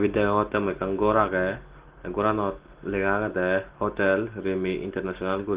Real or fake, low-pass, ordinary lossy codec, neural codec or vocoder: real; 3.6 kHz; Opus, 16 kbps; none